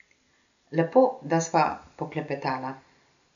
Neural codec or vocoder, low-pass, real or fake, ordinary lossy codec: none; 7.2 kHz; real; none